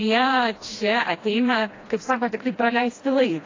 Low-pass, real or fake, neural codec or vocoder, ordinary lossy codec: 7.2 kHz; fake; codec, 16 kHz, 1 kbps, FreqCodec, smaller model; AAC, 32 kbps